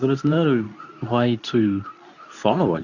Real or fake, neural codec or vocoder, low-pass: fake; codec, 24 kHz, 0.9 kbps, WavTokenizer, medium speech release version 2; 7.2 kHz